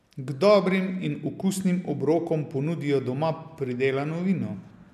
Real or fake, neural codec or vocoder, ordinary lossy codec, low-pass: real; none; none; 14.4 kHz